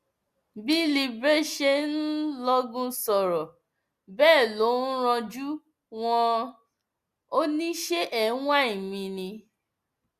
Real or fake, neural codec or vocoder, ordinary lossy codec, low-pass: real; none; Opus, 64 kbps; 14.4 kHz